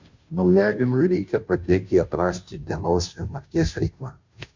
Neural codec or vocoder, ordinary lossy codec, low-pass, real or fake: codec, 16 kHz, 0.5 kbps, FunCodec, trained on Chinese and English, 25 frames a second; MP3, 64 kbps; 7.2 kHz; fake